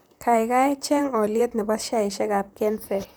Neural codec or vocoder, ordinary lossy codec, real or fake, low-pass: vocoder, 44.1 kHz, 128 mel bands, Pupu-Vocoder; none; fake; none